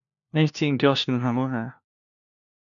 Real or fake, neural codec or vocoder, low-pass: fake; codec, 16 kHz, 1 kbps, FunCodec, trained on LibriTTS, 50 frames a second; 7.2 kHz